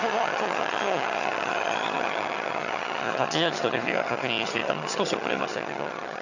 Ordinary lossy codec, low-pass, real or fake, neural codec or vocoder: none; 7.2 kHz; fake; vocoder, 22.05 kHz, 80 mel bands, HiFi-GAN